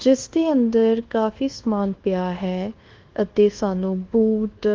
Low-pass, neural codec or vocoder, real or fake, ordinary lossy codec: 7.2 kHz; codec, 24 kHz, 1.2 kbps, DualCodec; fake; Opus, 16 kbps